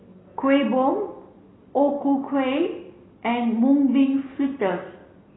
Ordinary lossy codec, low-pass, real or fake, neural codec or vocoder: AAC, 16 kbps; 7.2 kHz; real; none